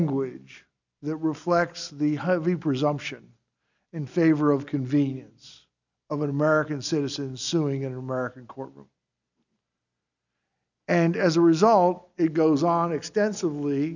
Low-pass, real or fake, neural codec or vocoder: 7.2 kHz; real; none